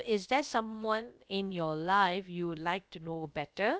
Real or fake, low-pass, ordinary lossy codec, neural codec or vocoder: fake; none; none; codec, 16 kHz, about 1 kbps, DyCAST, with the encoder's durations